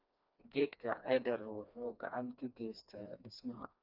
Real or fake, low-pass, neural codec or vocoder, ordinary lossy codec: fake; 5.4 kHz; codec, 16 kHz, 2 kbps, FreqCodec, smaller model; none